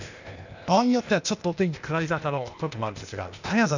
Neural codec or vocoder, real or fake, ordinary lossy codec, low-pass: codec, 16 kHz, 0.8 kbps, ZipCodec; fake; none; 7.2 kHz